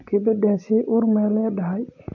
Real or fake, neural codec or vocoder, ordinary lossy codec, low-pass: real; none; AAC, 48 kbps; 7.2 kHz